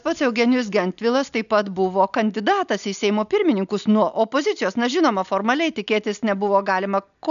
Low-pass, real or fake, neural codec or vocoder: 7.2 kHz; real; none